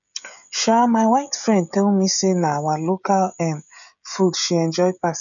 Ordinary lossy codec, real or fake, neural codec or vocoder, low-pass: none; fake; codec, 16 kHz, 16 kbps, FreqCodec, smaller model; 7.2 kHz